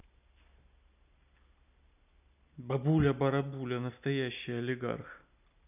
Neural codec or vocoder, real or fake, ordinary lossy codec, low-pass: none; real; none; 3.6 kHz